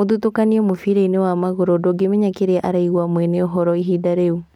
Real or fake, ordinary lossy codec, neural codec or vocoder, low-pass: real; MP3, 96 kbps; none; 19.8 kHz